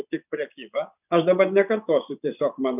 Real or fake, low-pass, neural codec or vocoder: fake; 3.6 kHz; vocoder, 24 kHz, 100 mel bands, Vocos